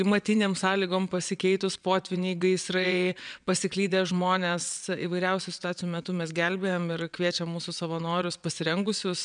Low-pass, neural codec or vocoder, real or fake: 9.9 kHz; vocoder, 22.05 kHz, 80 mel bands, WaveNeXt; fake